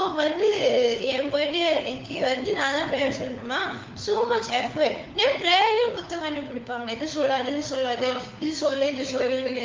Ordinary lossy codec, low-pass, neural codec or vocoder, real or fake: Opus, 24 kbps; 7.2 kHz; codec, 16 kHz, 4 kbps, FunCodec, trained on LibriTTS, 50 frames a second; fake